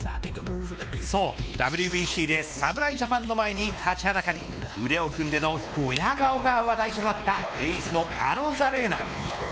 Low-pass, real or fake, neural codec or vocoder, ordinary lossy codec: none; fake; codec, 16 kHz, 2 kbps, X-Codec, WavLM features, trained on Multilingual LibriSpeech; none